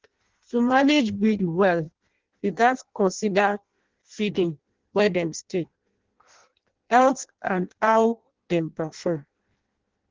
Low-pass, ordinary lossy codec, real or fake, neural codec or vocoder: 7.2 kHz; Opus, 16 kbps; fake; codec, 16 kHz in and 24 kHz out, 0.6 kbps, FireRedTTS-2 codec